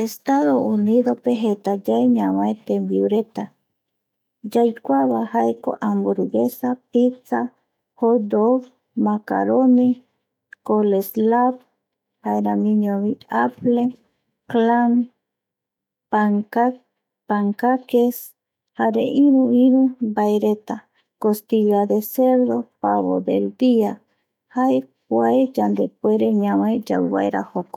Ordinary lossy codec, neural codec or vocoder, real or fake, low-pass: none; autoencoder, 48 kHz, 128 numbers a frame, DAC-VAE, trained on Japanese speech; fake; 19.8 kHz